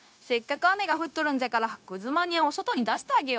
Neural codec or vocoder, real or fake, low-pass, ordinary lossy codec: codec, 16 kHz, 0.9 kbps, LongCat-Audio-Codec; fake; none; none